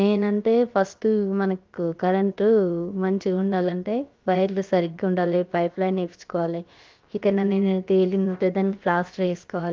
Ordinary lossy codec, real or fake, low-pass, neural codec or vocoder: Opus, 32 kbps; fake; 7.2 kHz; codec, 16 kHz, about 1 kbps, DyCAST, with the encoder's durations